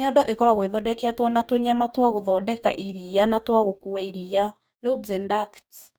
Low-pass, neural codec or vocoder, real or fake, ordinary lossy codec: none; codec, 44.1 kHz, 2.6 kbps, DAC; fake; none